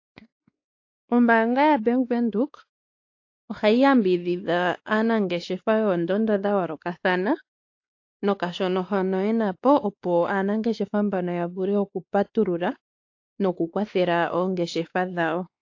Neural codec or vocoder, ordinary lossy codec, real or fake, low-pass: codec, 16 kHz, 2 kbps, X-Codec, WavLM features, trained on Multilingual LibriSpeech; AAC, 48 kbps; fake; 7.2 kHz